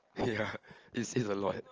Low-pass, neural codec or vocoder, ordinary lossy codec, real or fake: 7.2 kHz; none; Opus, 24 kbps; real